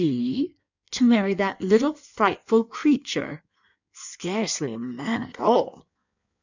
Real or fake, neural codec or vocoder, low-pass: fake; codec, 16 kHz in and 24 kHz out, 1.1 kbps, FireRedTTS-2 codec; 7.2 kHz